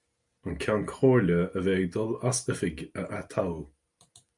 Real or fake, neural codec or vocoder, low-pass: real; none; 10.8 kHz